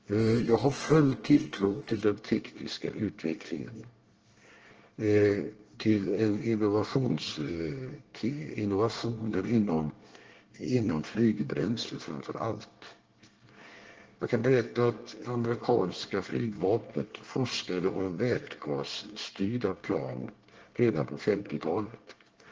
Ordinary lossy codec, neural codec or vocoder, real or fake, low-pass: Opus, 16 kbps; codec, 24 kHz, 1 kbps, SNAC; fake; 7.2 kHz